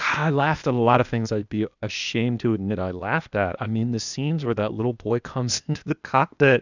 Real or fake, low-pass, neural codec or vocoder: fake; 7.2 kHz; codec, 16 kHz, 0.8 kbps, ZipCodec